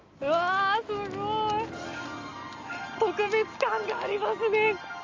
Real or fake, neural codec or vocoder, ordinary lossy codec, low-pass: real; none; Opus, 32 kbps; 7.2 kHz